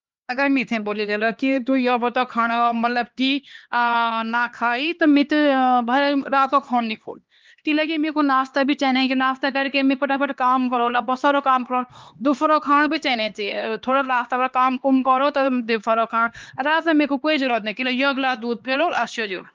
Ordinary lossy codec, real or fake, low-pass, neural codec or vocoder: Opus, 32 kbps; fake; 7.2 kHz; codec, 16 kHz, 2 kbps, X-Codec, HuBERT features, trained on LibriSpeech